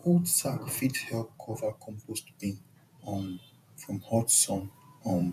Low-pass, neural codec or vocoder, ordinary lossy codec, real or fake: 14.4 kHz; vocoder, 48 kHz, 128 mel bands, Vocos; none; fake